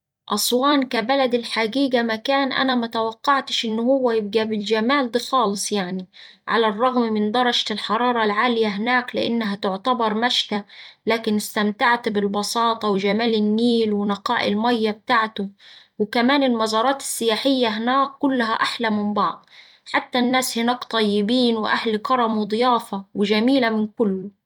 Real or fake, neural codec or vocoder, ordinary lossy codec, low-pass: fake; vocoder, 44.1 kHz, 128 mel bands every 256 samples, BigVGAN v2; MP3, 96 kbps; 19.8 kHz